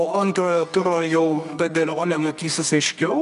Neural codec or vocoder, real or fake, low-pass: codec, 24 kHz, 0.9 kbps, WavTokenizer, medium music audio release; fake; 10.8 kHz